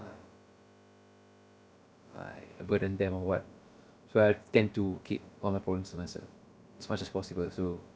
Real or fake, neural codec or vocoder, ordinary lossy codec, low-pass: fake; codec, 16 kHz, about 1 kbps, DyCAST, with the encoder's durations; none; none